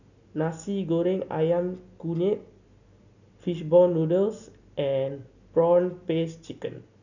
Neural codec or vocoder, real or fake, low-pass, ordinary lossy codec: none; real; 7.2 kHz; none